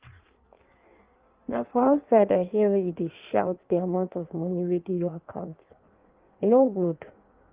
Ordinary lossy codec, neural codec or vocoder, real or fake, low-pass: Opus, 32 kbps; codec, 16 kHz in and 24 kHz out, 1.1 kbps, FireRedTTS-2 codec; fake; 3.6 kHz